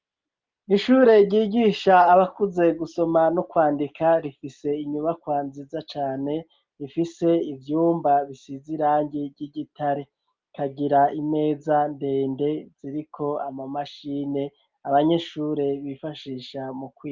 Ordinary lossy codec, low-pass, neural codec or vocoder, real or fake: Opus, 32 kbps; 7.2 kHz; none; real